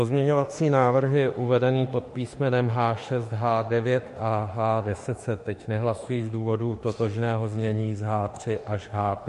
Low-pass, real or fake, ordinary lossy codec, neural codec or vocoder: 14.4 kHz; fake; MP3, 48 kbps; autoencoder, 48 kHz, 32 numbers a frame, DAC-VAE, trained on Japanese speech